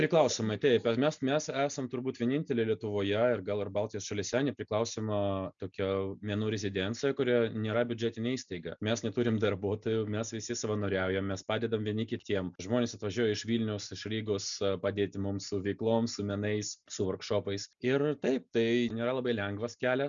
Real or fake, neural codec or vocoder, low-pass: real; none; 7.2 kHz